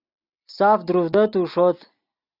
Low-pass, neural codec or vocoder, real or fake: 5.4 kHz; none; real